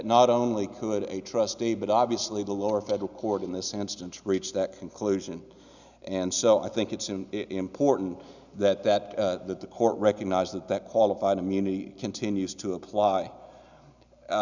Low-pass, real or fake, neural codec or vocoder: 7.2 kHz; real; none